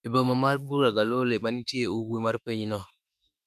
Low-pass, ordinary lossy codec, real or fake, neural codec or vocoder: 14.4 kHz; none; fake; autoencoder, 48 kHz, 32 numbers a frame, DAC-VAE, trained on Japanese speech